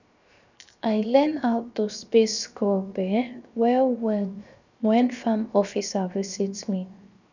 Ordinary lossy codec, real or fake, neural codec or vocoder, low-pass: none; fake; codec, 16 kHz, 0.7 kbps, FocalCodec; 7.2 kHz